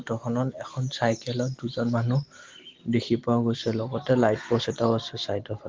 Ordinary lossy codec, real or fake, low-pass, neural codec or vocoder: Opus, 16 kbps; real; 7.2 kHz; none